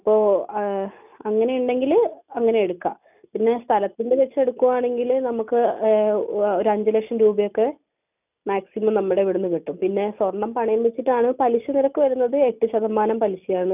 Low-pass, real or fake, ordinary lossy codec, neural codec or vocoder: 3.6 kHz; real; none; none